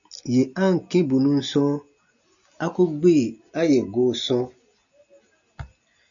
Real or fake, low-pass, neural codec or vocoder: real; 7.2 kHz; none